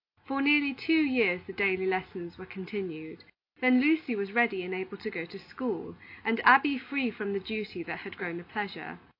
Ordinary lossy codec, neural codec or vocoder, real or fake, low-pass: AAC, 32 kbps; none; real; 5.4 kHz